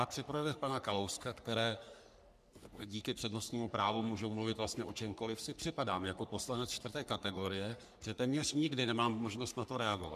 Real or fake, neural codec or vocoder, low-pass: fake; codec, 44.1 kHz, 3.4 kbps, Pupu-Codec; 14.4 kHz